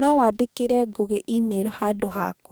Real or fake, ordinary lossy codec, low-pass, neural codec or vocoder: fake; none; none; codec, 44.1 kHz, 2.6 kbps, DAC